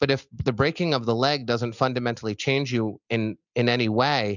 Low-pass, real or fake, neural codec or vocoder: 7.2 kHz; real; none